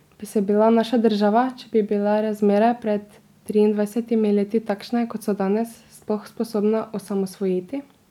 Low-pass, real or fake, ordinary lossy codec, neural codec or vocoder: 19.8 kHz; real; none; none